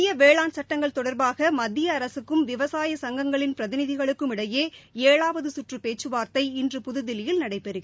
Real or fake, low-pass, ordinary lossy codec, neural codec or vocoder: real; none; none; none